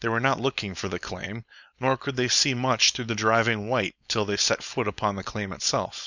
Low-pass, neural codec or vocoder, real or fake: 7.2 kHz; codec, 16 kHz, 4.8 kbps, FACodec; fake